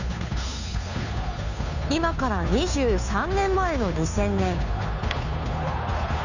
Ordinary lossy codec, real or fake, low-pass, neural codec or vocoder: none; fake; 7.2 kHz; codec, 16 kHz, 2 kbps, FunCodec, trained on Chinese and English, 25 frames a second